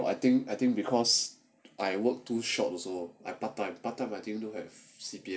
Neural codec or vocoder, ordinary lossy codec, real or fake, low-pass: none; none; real; none